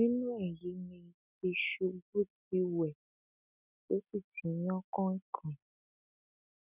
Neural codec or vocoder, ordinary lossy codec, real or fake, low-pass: none; none; real; 3.6 kHz